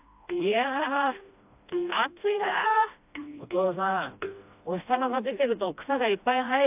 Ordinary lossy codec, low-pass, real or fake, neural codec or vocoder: AAC, 32 kbps; 3.6 kHz; fake; codec, 16 kHz, 1 kbps, FreqCodec, smaller model